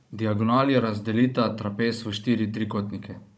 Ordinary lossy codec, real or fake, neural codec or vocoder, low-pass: none; fake; codec, 16 kHz, 16 kbps, FunCodec, trained on Chinese and English, 50 frames a second; none